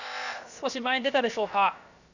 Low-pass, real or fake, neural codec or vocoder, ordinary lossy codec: 7.2 kHz; fake; codec, 16 kHz, about 1 kbps, DyCAST, with the encoder's durations; none